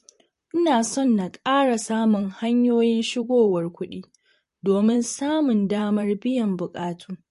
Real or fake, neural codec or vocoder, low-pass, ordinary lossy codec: fake; vocoder, 44.1 kHz, 128 mel bands, Pupu-Vocoder; 14.4 kHz; MP3, 48 kbps